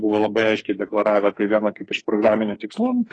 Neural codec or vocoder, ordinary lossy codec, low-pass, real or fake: codec, 44.1 kHz, 2.6 kbps, SNAC; AAC, 32 kbps; 9.9 kHz; fake